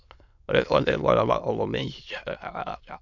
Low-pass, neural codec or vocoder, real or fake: 7.2 kHz; autoencoder, 22.05 kHz, a latent of 192 numbers a frame, VITS, trained on many speakers; fake